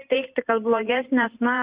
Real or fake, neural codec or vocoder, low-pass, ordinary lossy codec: fake; vocoder, 44.1 kHz, 128 mel bands every 512 samples, BigVGAN v2; 3.6 kHz; Opus, 64 kbps